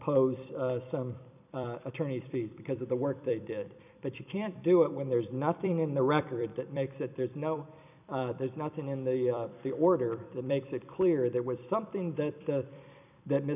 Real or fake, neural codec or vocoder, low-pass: fake; vocoder, 44.1 kHz, 128 mel bands every 256 samples, BigVGAN v2; 3.6 kHz